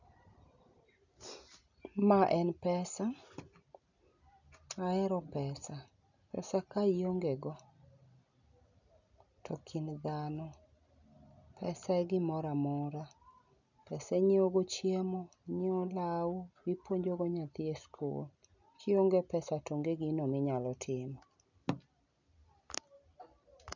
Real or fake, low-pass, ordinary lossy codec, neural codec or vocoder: real; 7.2 kHz; none; none